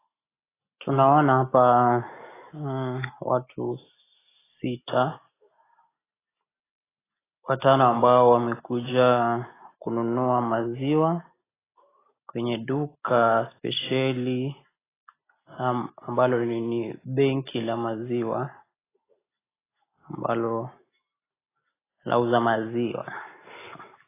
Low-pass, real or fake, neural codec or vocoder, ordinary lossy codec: 3.6 kHz; real; none; AAC, 16 kbps